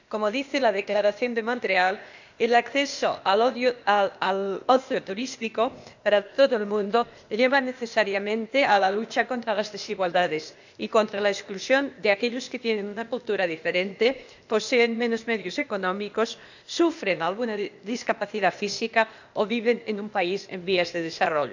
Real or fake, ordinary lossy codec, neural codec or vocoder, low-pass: fake; none; codec, 16 kHz, 0.8 kbps, ZipCodec; 7.2 kHz